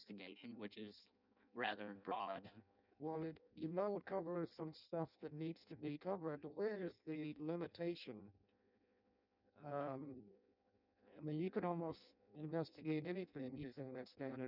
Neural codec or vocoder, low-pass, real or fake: codec, 16 kHz in and 24 kHz out, 0.6 kbps, FireRedTTS-2 codec; 5.4 kHz; fake